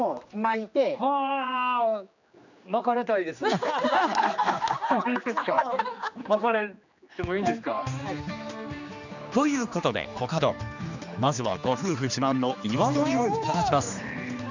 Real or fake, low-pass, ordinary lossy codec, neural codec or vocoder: fake; 7.2 kHz; none; codec, 16 kHz, 2 kbps, X-Codec, HuBERT features, trained on general audio